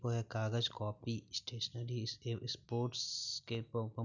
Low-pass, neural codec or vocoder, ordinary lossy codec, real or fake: 7.2 kHz; none; none; real